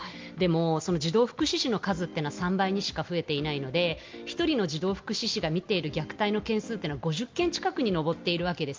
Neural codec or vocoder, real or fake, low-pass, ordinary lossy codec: none; real; 7.2 kHz; Opus, 32 kbps